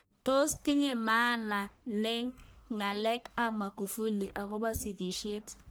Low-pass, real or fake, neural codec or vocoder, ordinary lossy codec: none; fake; codec, 44.1 kHz, 1.7 kbps, Pupu-Codec; none